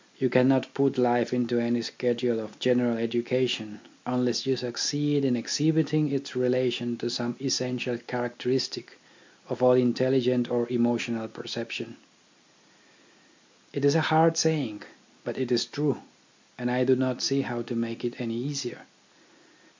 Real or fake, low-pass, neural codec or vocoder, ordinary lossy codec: real; 7.2 kHz; none; MP3, 64 kbps